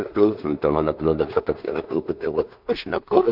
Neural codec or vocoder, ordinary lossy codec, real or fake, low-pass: codec, 16 kHz in and 24 kHz out, 0.4 kbps, LongCat-Audio-Codec, two codebook decoder; AAC, 48 kbps; fake; 5.4 kHz